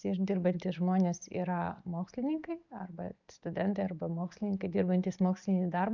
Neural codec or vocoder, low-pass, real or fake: none; 7.2 kHz; real